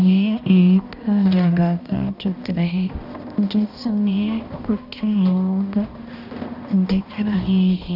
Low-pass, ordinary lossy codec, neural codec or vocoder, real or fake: 5.4 kHz; none; codec, 16 kHz, 1 kbps, X-Codec, HuBERT features, trained on balanced general audio; fake